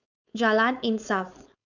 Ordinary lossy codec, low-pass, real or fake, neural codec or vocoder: none; 7.2 kHz; fake; codec, 16 kHz, 4.8 kbps, FACodec